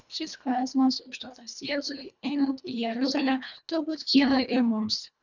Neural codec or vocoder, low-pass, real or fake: codec, 24 kHz, 1.5 kbps, HILCodec; 7.2 kHz; fake